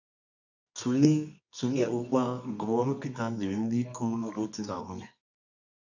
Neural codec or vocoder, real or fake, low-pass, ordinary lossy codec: codec, 24 kHz, 0.9 kbps, WavTokenizer, medium music audio release; fake; 7.2 kHz; none